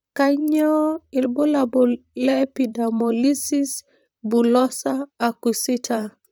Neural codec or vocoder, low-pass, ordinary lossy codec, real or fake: vocoder, 44.1 kHz, 128 mel bands, Pupu-Vocoder; none; none; fake